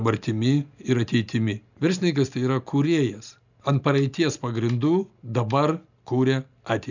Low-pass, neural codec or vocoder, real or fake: 7.2 kHz; none; real